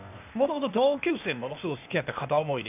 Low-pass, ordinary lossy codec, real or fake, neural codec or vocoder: 3.6 kHz; none; fake; codec, 16 kHz, 0.8 kbps, ZipCodec